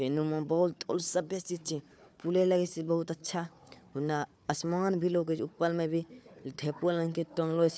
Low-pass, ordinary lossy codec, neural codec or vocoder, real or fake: none; none; codec, 16 kHz, 4 kbps, FunCodec, trained on Chinese and English, 50 frames a second; fake